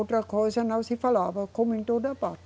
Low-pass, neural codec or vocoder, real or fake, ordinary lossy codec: none; none; real; none